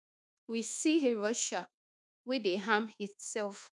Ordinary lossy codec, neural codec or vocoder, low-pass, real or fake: none; codec, 24 kHz, 1.2 kbps, DualCodec; 10.8 kHz; fake